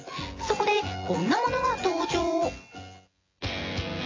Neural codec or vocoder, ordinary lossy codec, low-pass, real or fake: vocoder, 24 kHz, 100 mel bands, Vocos; AAC, 32 kbps; 7.2 kHz; fake